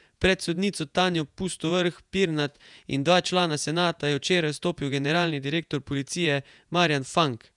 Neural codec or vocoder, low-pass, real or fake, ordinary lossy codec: vocoder, 48 kHz, 128 mel bands, Vocos; 10.8 kHz; fake; none